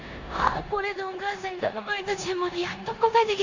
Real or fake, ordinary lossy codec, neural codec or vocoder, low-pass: fake; Opus, 64 kbps; codec, 16 kHz in and 24 kHz out, 0.9 kbps, LongCat-Audio-Codec, four codebook decoder; 7.2 kHz